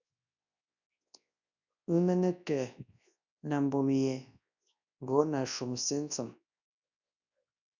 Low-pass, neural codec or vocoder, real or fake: 7.2 kHz; codec, 24 kHz, 0.9 kbps, WavTokenizer, large speech release; fake